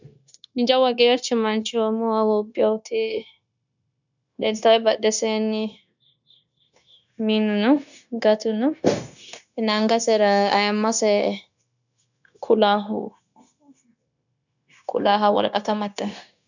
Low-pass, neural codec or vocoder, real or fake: 7.2 kHz; codec, 16 kHz, 0.9 kbps, LongCat-Audio-Codec; fake